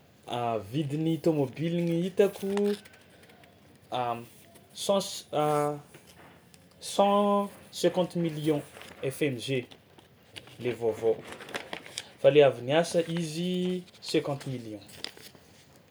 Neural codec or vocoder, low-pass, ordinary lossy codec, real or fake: none; none; none; real